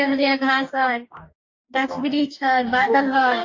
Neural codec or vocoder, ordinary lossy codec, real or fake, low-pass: codec, 44.1 kHz, 2.6 kbps, DAC; AAC, 48 kbps; fake; 7.2 kHz